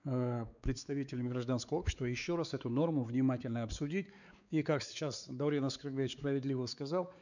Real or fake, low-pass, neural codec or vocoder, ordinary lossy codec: fake; 7.2 kHz; codec, 16 kHz, 4 kbps, X-Codec, WavLM features, trained on Multilingual LibriSpeech; none